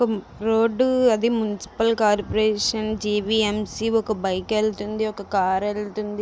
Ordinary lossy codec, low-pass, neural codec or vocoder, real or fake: none; none; none; real